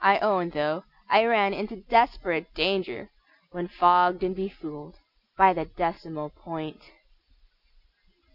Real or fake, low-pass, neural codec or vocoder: real; 5.4 kHz; none